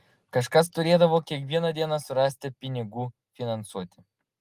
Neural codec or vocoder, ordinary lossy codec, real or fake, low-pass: none; Opus, 24 kbps; real; 14.4 kHz